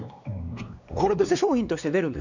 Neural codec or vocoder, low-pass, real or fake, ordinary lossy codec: codec, 16 kHz, 2 kbps, X-Codec, WavLM features, trained on Multilingual LibriSpeech; 7.2 kHz; fake; none